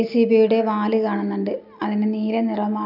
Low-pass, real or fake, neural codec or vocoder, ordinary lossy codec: 5.4 kHz; real; none; none